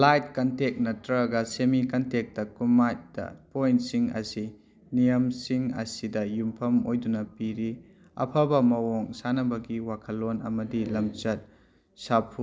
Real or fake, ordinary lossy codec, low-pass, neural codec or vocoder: real; none; none; none